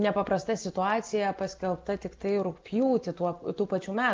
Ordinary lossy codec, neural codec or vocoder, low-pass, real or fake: Opus, 24 kbps; none; 7.2 kHz; real